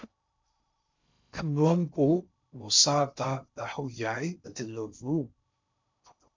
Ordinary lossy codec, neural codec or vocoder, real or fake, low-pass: MP3, 64 kbps; codec, 16 kHz in and 24 kHz out, 0.6 kbps, FocalCodec, streaming, 2048 codes; fake; 7.2 kHz